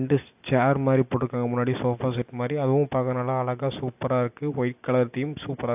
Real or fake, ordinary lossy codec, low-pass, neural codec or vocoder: real; AAC, 32 kbps; 3.6 kHz; none